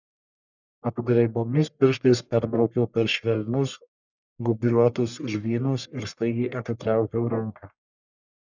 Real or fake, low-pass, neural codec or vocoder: fake; 7.2 kHz; codec, 44.1 kHz, 1.7 kbps, Pupu-Codec